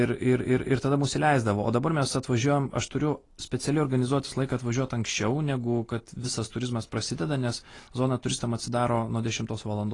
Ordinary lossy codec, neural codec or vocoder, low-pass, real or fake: AAC, 32 kbps; none; 10.8 kHz; real